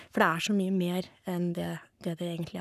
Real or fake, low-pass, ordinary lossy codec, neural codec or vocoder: fake; 14.4 kHz; none; codec, 44.1 kHz, 7.8 kbps, Pupu-Codec